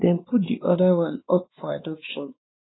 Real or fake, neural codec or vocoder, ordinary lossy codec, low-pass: fake; codec, 16 kHz, 2 kbps, X-Codec, WavLM features, trained on Multilingual LibriSpeech; AAC, 16 kbps; 7.2 kHz